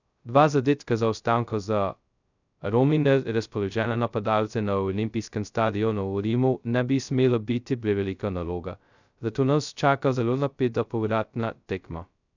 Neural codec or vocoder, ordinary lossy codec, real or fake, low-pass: codec, 16 kHz, 0.2 kbps, FocalCodec; none; fake; 7.2 kHz